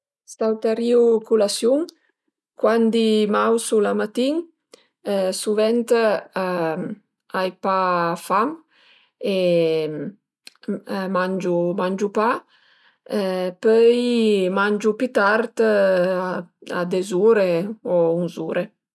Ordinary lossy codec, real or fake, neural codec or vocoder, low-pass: none; real; none; none